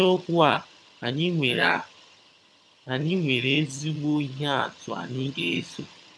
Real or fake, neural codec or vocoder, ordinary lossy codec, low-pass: fake; vocoder, 22.05 kHz, 80 mel bands, HiFi-GAN; none; none